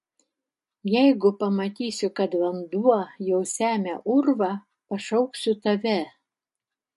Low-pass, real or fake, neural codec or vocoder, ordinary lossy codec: 14.4 kHz; real; none; MP3, 48 kbps